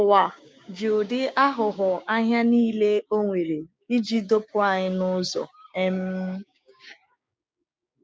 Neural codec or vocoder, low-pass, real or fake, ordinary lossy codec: codec, 16 kHz, 6 kbps, DAC; none; fake; none